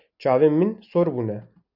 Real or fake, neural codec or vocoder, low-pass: real; none; 5.4 kHz